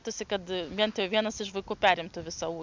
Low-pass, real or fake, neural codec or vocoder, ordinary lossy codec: 7.2 kHz; real; none; MP3, 64 kbps